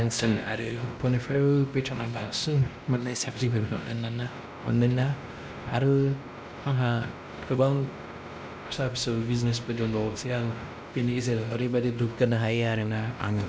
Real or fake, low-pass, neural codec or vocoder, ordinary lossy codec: fake; none; codec, 16 kHz, 1 kbps, X-Codec, WavLM features, trained on Multilingual LibriSpeech; none